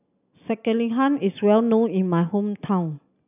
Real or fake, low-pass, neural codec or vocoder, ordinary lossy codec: real; 3.6 kHz; none; none